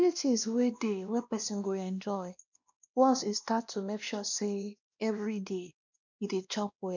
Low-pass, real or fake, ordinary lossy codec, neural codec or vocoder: 7.2 kHz; fake; none; codec, 16 kHz, 1 kbps, X-Codec, WavLM features, trained on Multilingual LibriSpeech